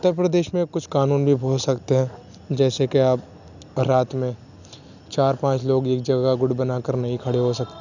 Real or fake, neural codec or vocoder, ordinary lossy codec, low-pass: real; none; none; 7.2 kHz